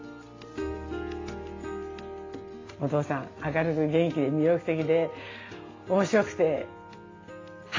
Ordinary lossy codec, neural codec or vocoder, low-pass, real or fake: AAC, 32 kbps; none; 7.2 kHz; real